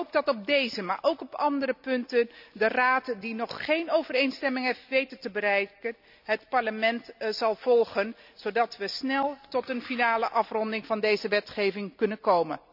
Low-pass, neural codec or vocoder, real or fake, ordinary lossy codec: 5.4 kHz; none; real; none